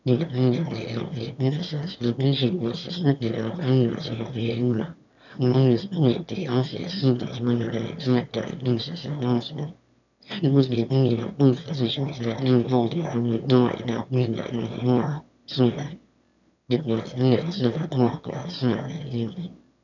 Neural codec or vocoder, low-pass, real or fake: autoencoder, 22.05 kHz, a latent of 192 numbers a frame, VITS, trained on one speaker; 7.2 kHz; fake